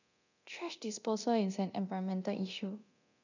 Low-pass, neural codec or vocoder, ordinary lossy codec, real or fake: 7.2 kHz; codec, 24 kHz, 0.9 kbps, DualCodec; none; fake